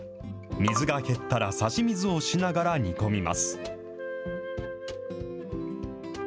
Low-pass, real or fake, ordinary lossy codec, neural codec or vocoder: none; real; none; none